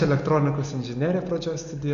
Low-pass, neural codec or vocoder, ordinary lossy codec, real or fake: 7.2 kHz; none; AAC, 64 kbps; real